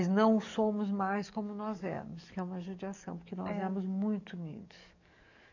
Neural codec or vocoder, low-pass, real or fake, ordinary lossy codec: codec, 44.1 kHz, 7.8 kbps, DAC; 7.2 kHz; fake; none